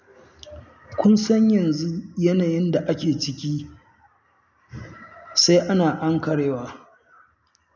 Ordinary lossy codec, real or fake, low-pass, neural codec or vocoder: none; real; 7.2 kHz; none